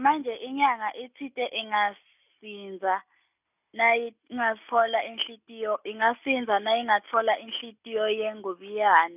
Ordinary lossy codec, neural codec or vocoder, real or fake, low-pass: none; none; real; 3.6 kHz